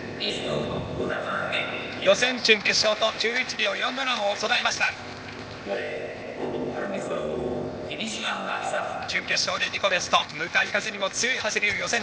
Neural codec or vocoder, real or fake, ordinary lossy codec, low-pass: codec, 16 kHz, 0.8 kbps, ZipCodec; fake; none; none